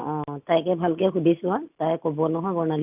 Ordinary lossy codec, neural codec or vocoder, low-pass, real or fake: AAC, 32 kbps; none; 3.6 kHz; real